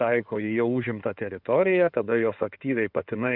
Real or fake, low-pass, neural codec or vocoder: fake; 5.4 kHz; codec, 16 kHz in and 24 kHz out, 2.2 kbps, FireRedTTS-2 codec